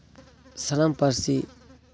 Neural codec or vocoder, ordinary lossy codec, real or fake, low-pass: none; none; real; none